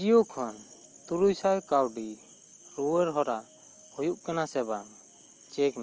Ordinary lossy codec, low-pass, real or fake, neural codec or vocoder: Opus, 24 kbps; 7.2 kHz; real; none